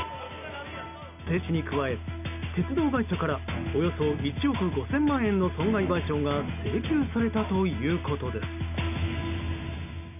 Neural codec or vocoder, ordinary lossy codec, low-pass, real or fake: none; none; 3.6 kHz; real